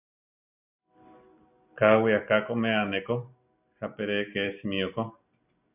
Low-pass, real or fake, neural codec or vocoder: 3.6 kHz; real; none